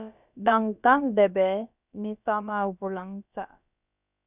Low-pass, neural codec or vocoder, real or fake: 3.6 kHz; codec, 16 kHz, about 1 kbps, DyCAST, with the encoder's durations; fake